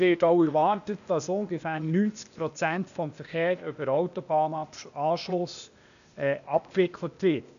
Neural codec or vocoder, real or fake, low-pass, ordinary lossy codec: codec, 16 kHz, 0.8 kbps, ZipCodec; fake; 7.2 kHz; none